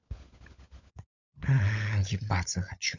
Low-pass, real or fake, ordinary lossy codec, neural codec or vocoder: 7.2 kHz; fake; none; codec, 16 kHz, 16 kbps, FunCodec, trained on LibriTTS, 50 frames a second